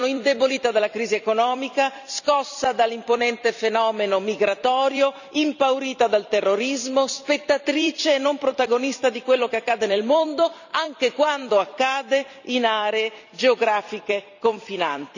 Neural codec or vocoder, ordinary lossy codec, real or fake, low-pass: vocoder, 44.1 kHz, 128 mel bands every 256 samples, BigVGAN v2; none; fake; 7.2 kHz